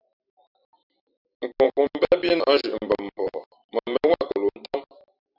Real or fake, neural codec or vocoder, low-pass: fake; vocoder, 44.1 kHz, 128 mel bands every 256 samples, BigVGAN v2; 5.4 kHz